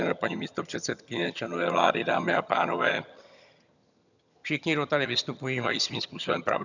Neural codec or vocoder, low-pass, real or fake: vocoder, 22.05 kHz, 80 mel bands, HiFi-GAN; 7.2 kHz; fake